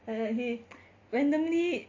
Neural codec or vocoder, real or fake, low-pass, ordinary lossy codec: none; real; 7.2 kHz; MP3, 48 kbps